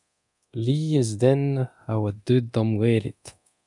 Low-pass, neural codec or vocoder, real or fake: 10.8 kHz; codec, 24 kHz, 0.9 kbps, DualCodec; fake